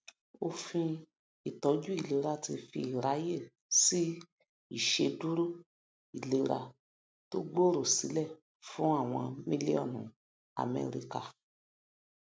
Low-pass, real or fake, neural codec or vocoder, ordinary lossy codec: none; real; none; none